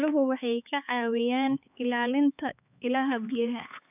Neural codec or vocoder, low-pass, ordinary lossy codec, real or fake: codec, 16 kHz, 4 kbps, X-Codec, HuBERT features, trained on LibriSpeech; 3.6 kHz; none; fake